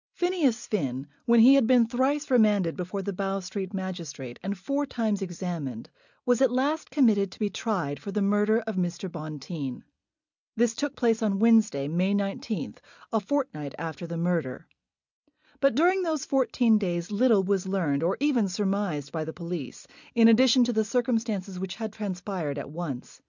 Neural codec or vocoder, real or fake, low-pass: none; real; 7.2 kHz